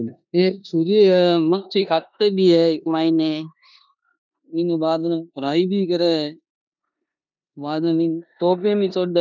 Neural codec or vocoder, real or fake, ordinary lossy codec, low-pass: codec, 16 kHz in and 24 kHz out, 0.9 kbps, LongCat-Audio-Codec, four codebook decoder; fake; none; 7.2 kHz